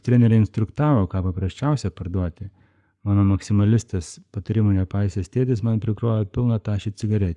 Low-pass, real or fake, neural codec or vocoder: 10.8 kHz; fake; codec, 44.1 kHz, 7.8 kbps, Pupu-Codec